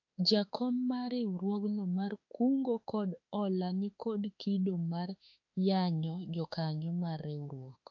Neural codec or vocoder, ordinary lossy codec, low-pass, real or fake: autoencoder, 48 kHz, 32 numbers a frame, DAC-VAE, trained on Japanese speech; AAC, 48 kbps; 7.2 kHz; fake